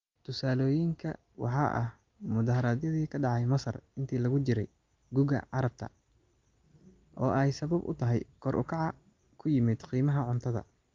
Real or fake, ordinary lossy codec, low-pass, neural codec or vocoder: real; Opus, 32 kbps; 7.2 kHz; none